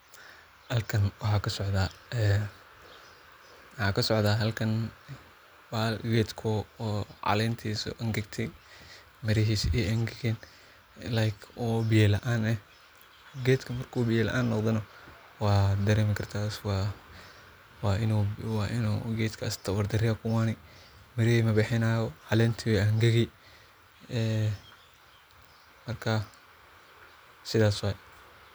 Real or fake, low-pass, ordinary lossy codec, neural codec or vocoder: real; none; none; none